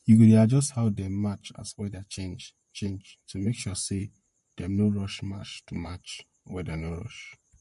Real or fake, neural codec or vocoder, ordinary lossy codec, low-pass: fake; vocoder, 44.1 kHz, 128 mel bands, Pupu-Vocoder; MP3, 48 kbps; 14.4 kHz